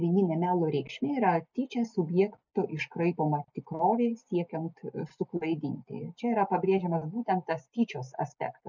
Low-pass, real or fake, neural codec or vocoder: 7.2 kHz; real; none